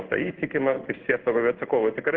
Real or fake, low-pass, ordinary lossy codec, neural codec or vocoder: fake; 7.2 kHz; Opus, 16 kbps; codec, 16 kHz in and 24 kHz out, 1 kbps, XY-Tokenizer